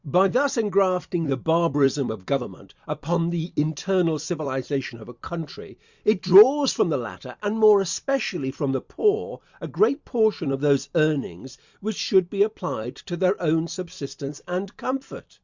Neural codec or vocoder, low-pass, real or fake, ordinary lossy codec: vocoder, 44.1 kHz, 128 mel bands every 256 samples, BigVGAN v2; 7.2 kHz; fake; Opus, 64 kbps